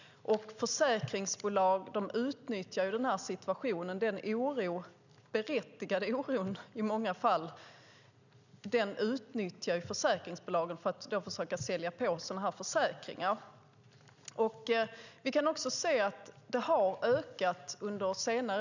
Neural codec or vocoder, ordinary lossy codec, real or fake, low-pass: none; none; real; 7.2 kHz